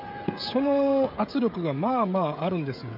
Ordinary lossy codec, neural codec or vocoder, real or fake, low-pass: MP3, 48 kbps; codec, 16 kHz, 16 kbps, FreqCodec, smaller model; fake; 5.4 kHz